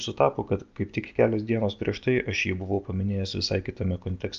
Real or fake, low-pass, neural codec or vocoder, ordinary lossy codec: real; 7.2 kHz; none; Opus, 24 kbps